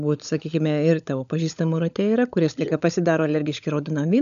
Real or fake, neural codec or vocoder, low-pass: fake; codec, 16 kHz, 16 kbps, FunCodec, trained on LibriTTS, 50 frames a second; 7.2 kHz